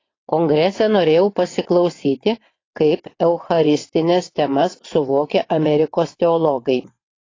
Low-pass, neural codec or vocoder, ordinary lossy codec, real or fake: 7.2 kHz; vocoder, 22.05 kHz, 80 mel bands, WaveNeXt; AAC, 32 kbps; fake